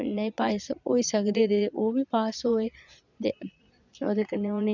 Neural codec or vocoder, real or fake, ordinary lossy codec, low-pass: vocoder, 22.05 kHz, 80 mel bands, Vocos; fake; none; 7.2 kHz